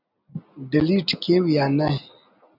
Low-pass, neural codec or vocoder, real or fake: 5.4 kHz; none; real